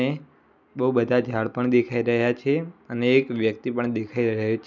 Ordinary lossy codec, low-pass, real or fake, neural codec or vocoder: none; 7.2 kHz; real; none